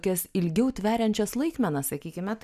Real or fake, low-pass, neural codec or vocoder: real; 14.4 kHz; none